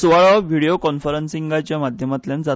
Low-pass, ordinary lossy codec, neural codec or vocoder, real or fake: none; none; none; real